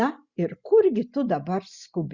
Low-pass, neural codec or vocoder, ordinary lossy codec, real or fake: 7.2 kHz; none; Opus, 64 kbps; real